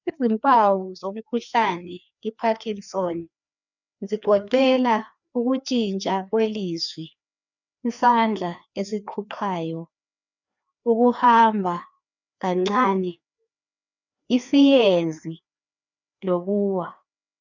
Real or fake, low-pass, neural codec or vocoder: fake; 7.2 kHz; codec, 16 kHz, 2 kbps, FreqCodec, larger model